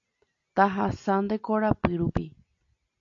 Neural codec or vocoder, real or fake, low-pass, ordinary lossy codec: none; real; 7.2 kHz; AAC, 48 kbps